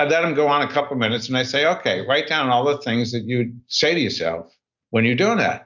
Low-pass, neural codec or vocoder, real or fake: 7.2 kHz; none; real